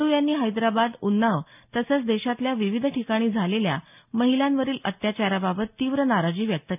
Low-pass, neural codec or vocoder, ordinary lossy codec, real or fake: 3.6 kHz; none; none; real